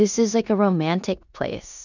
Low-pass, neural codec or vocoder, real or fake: 7.2 kHz; codec, 16 kHz in and 24 kHz out, 0.9 kbps, LongCat-Audio-Codec, four codebook decoder; fake